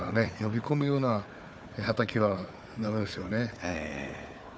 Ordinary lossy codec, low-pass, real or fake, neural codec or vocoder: none; none; fake; codec, 16 kHz, 4 kbps, FunCodec, trained on Chinese and English, 50 frames a second